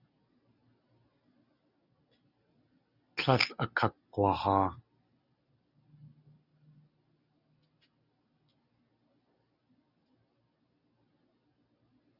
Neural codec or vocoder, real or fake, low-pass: none; real; 5.4 kHz